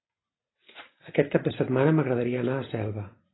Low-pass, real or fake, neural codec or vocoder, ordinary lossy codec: 7.2 kHz; real; none; AAC, 16 kbps